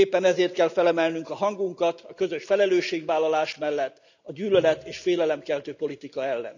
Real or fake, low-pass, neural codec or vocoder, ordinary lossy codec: real; 7.2 kHz; none; none